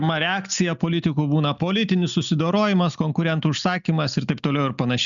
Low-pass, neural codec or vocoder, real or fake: 7.2 kHz; none; real